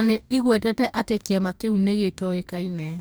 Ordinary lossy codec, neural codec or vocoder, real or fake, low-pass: none; codec, 44.1 kHz, 2.6 kbps, DAC; fake; none